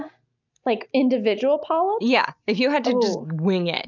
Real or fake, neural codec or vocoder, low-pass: real; none; 7.2 kHz